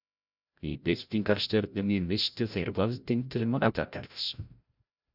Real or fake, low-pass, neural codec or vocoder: fake; 5.4 kHz; codec, 16 kHz, 0.5 kbps, FreqCodec, larger model